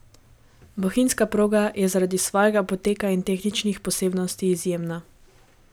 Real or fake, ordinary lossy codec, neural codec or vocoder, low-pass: real; none; none; none